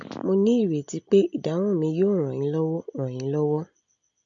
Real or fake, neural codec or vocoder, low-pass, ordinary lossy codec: real; none; 7.2 kHz; AAC, 64 kbps